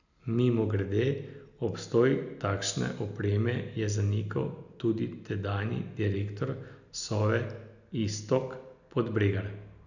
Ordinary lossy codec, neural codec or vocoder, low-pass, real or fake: none; none; 7.2 kHz; real